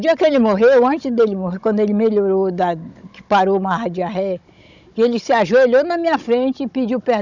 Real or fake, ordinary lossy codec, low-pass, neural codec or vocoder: fake; none; 7.2 kHz; codec, 16 kHz, 16 kbps, FunCodec, trained on Chinese and English, 50 frames a second